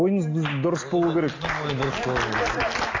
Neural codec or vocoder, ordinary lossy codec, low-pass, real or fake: none; AAC, 32 kbps; 7.2 kHz; real